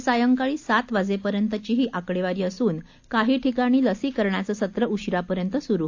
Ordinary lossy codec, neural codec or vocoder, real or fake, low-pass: AAC, 48 kbps; none; real; 7.2 kHz